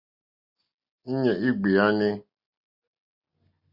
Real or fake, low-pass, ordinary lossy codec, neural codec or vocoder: real; 5.4 kHz; Opus, 64 kbps; none